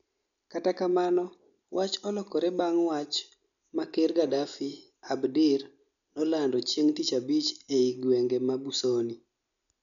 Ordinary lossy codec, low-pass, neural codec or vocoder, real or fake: none; 7.2 kHz; none; real